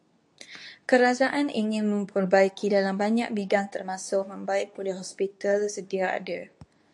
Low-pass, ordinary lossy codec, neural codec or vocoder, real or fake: 10.8 kHz; AAC, 64 kbps; codec, 24 kHz, 0.9 kbps, WavTokenizer, medium speech release version 2; fake